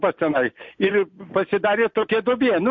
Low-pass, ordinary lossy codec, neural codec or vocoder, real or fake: 7.2 kHz; MP3, 48 kbps; none; real